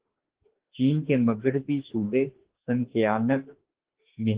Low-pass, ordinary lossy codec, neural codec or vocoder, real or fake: 3.6 kHz; Opus, 16 kbps; autoencoder, 48 kHz, 32 numbers a frame, DAC-VAE, trained on Japanese speech; fake